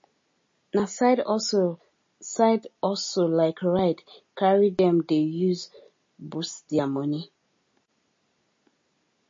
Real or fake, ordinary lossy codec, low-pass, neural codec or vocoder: real; MP3, 32 kbps; 7.2 kHz; none